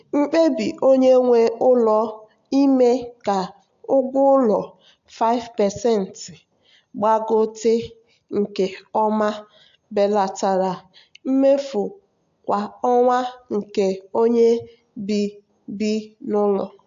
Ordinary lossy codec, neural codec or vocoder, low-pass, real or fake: AAC, 64 kbps; none; 7.2 kHz; real